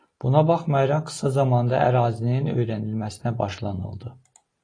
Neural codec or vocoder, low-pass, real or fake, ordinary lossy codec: none; 9.9 kHz; real; AAC, 32 kbps